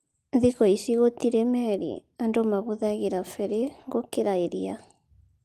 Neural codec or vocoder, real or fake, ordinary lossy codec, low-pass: none; real; Opus, 24 kbps; 14.4 kHz